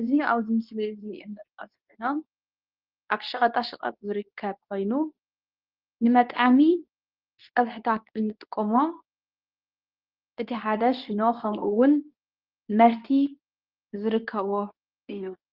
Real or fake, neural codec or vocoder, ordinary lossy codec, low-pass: fake; codec, 24 kHz, 0.9 kbps, WavTokenizer, medium speech release version 2; Opus, 32 kbps; 5.4 kHz